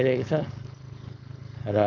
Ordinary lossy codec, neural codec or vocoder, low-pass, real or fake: none; codec, 16 kHz, 4.8 kbps, FACodec; 7.2 kHz; fake